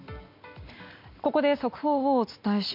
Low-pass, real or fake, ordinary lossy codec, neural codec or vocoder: 5.4 kHz; real; MP3, 48 kbps; none